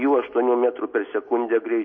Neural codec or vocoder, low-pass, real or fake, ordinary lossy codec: none; 7.2 kHz; real; MP3, 32 kbps